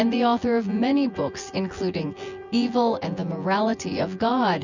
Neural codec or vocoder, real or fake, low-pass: vocoder, 24 kHz, 100 mel bands, Vocos; fake; 7.2 kHz